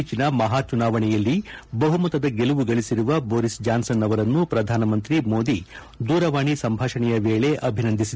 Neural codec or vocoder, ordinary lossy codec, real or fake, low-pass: none; none; real; none